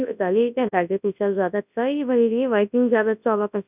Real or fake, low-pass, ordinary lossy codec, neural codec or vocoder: fake; 3.6 kHz; none; codec, 24 kHz, 0.9 kbps, WavTokenizer, large speech release